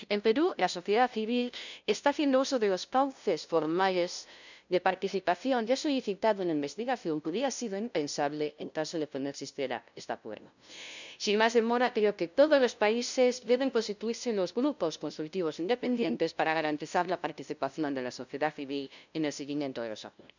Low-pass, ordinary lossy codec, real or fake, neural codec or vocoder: 7.2 kHz; none; fake; codec, 16 kHz, 0.5 kbps, FunCodec, trained on LibriTTS, 25 frames a second